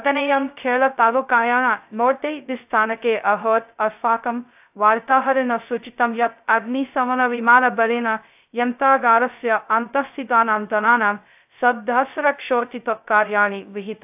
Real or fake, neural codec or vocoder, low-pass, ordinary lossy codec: fake; codec, 16 kHz, 0.2 kbps, FocalCodec; 3.6 kHz; AAC, 32 kbps